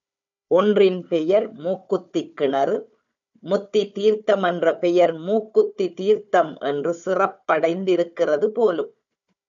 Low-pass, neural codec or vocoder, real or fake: 7.2 kHz; codec, 16 kHz, 4 kbps, FunCodec, trained on Chinese and English, 50 frames a second; fake